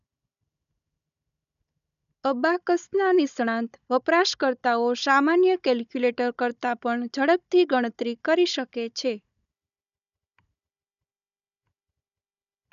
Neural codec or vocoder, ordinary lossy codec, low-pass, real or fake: codec, 16 kHz, 16 kbps, FunCodec, trained on Chinese and English, 50 frames a second; none; 7.2 kHz; fake